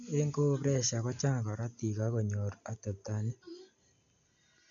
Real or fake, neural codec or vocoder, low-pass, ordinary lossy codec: real; none; 7.2 kHz; none